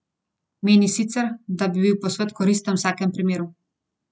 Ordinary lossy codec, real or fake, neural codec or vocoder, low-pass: none; real; none; none